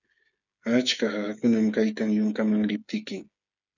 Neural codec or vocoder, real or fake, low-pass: codec, 16 kHz, 8 kbps, FreqCodec, smaller model; fake; 7.2 kHz